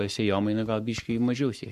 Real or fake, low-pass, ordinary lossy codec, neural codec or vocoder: fake; 14.4 kHz; MP3, 64 kbps; codec, 44.1 kHz, 7.8 kbps, Pupu-Codec